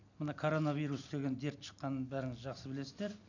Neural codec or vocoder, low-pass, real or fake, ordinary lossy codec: none; 7.2 kHz; real; none